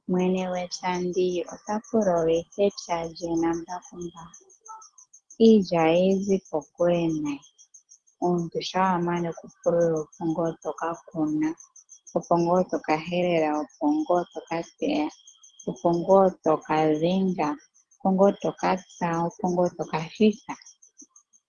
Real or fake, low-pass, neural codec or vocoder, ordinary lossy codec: real; 9.9 kHz; none; Opus, 16 kbps